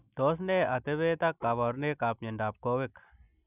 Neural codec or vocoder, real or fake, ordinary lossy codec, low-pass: none; real; none; 3.6 kHz